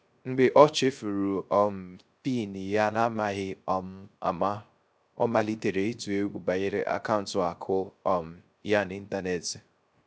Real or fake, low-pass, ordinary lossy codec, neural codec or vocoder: fake; none; none; codec, 16 kHz, 0.3 kbps, FocalCodec